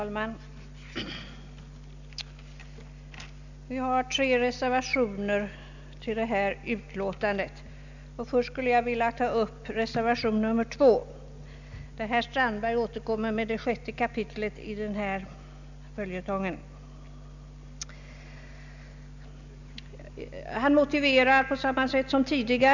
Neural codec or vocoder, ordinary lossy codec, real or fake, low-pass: none; none; real; 7.2 kHz